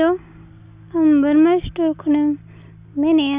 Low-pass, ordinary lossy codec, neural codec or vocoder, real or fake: 3.6 kHz; Opus, 64 kbps; none; real